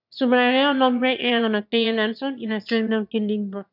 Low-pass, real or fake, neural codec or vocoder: 5.4 kHz; fake; autoencoder, 22.05 kHz, a latent of 192 numbers a frame, VITS, trained on one speaker